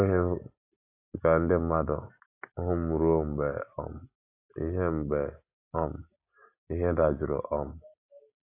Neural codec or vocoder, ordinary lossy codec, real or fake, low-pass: none; AAC, 24 kbps; real; 3.6 kHz